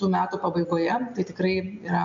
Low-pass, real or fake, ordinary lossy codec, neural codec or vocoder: 7.2 kHz; real; AAC, 64 kbps; none